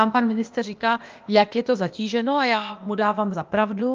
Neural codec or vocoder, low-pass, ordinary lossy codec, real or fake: codec, 16 kHz, 0.8 kbps, ZipCodec; 7.2 kHz; Opus, 24 kbps; fake